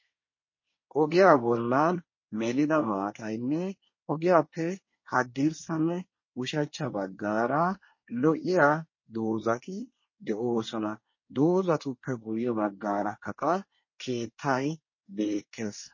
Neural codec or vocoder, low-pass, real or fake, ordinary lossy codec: codec, 24 kHz, 1 kbps, SNAC; 7.2 kHz; fake; MP3, 32 kbps